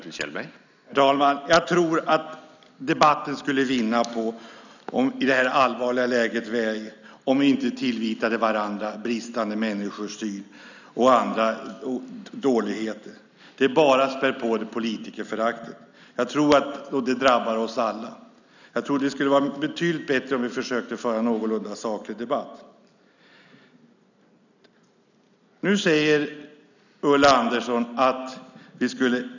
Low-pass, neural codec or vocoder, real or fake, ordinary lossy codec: 7.2 kHz; none; real; none